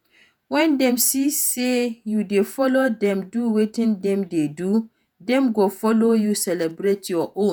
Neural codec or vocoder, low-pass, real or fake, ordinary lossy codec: vocoder, 48 kHz, 128 mel bands, Vocos; none; fake; none